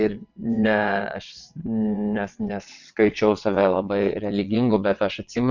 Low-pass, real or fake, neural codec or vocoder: 7.2 kHz; fake; vocoder, 22.05 kHz, 80 mel bands, WaveNeXt